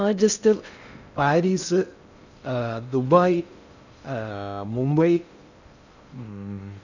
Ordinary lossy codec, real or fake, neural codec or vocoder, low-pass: none; fake; codec, 16 kHz in and 24 kHz out, 0.6 kbps, FocalCodec, streaming, 2048 codes; 7.2 kHz